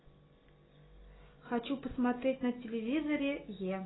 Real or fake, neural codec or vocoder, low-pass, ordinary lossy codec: real; none; 7.2 kHz; AAC, 16 kbps